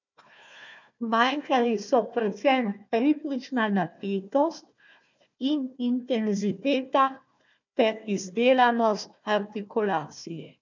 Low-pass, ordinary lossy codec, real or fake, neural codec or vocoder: 7.2 kHz; none; fake; codec, 16 kHz, 1 kbps, FunCodec, trained on Chinese and English, 50 frames a second